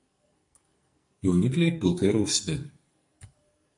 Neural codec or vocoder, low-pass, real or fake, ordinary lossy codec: codec, 44.1 kHz, 2.6 kbps, SNAC; 10.8 kHz; fake; MP3, 64 kbps